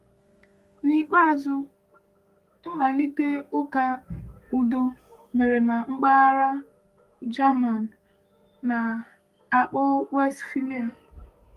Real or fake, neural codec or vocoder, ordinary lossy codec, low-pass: fake; codec, 32 kHz, 1.9 kbps, SNAC; Opus, 32 kbps; 14.4 kHz